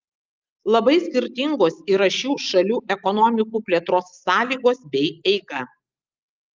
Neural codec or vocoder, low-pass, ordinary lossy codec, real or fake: none; 7.2 kHz; Opus, 24 kbps; real